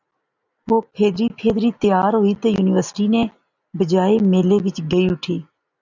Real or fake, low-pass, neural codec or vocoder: real; 7.2 kHz; none